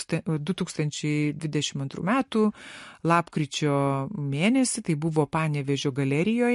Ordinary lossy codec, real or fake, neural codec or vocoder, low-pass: MP3, 48 kbps; real; none; 14.4 kHz